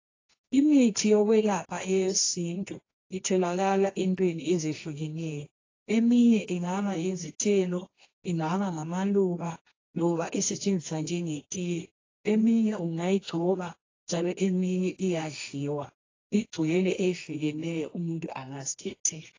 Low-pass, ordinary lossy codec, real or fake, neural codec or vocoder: 7.2 kHz; AAC, 32 kbps; fake; codec, 24 kHz, 0.9 kbps, WavTokenizer, medium music audio release